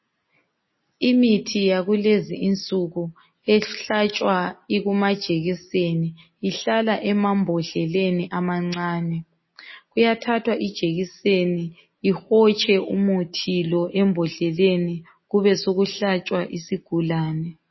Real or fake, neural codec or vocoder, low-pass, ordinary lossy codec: real; none; 7.2 kHz; MP3, 24 kbps